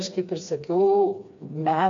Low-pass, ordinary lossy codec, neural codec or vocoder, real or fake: 7.2 kHz; AAC, 48 kbps; codec, 16 kHz, 2 kbps, FreqCodec, smaller model; fake